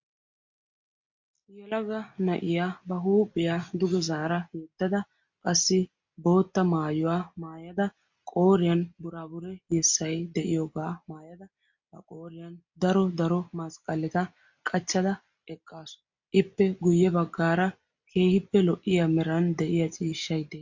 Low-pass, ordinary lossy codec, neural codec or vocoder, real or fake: 7.2 kHz; MP3, 48 kbps; none; real